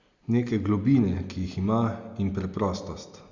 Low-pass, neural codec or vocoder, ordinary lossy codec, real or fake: 7.2 kHz; none; Opus, 64 kbps; real